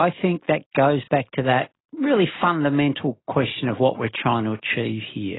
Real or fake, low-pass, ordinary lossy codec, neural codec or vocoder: real; 7.2 kHz; AAC, 16 kbps; none